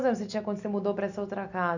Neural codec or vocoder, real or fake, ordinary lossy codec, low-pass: none; real; none; 7.2 kHz